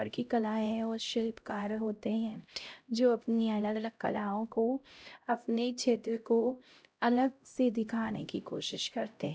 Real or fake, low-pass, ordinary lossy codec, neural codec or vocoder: fake; none; none; codec, 16 kHz, 0.5 kbps, X-Codec, HuBERT features, trained on LibriSpeech